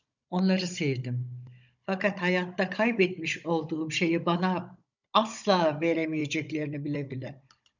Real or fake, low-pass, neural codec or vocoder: fake; 7.2 kHz; codec, 16 kHz, 16 kbps, FunCodec, trained on Chinese and English, 50 frames a second